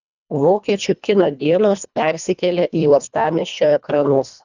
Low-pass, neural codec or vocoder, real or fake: 7.2 kHz; codec, 24 kHz, 1.5 kbps, HILCodec; fake